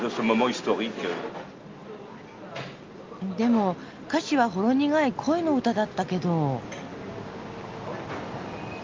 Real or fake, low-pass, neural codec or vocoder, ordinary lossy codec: real; 7.2 kHz; none; Opus, 32 kbps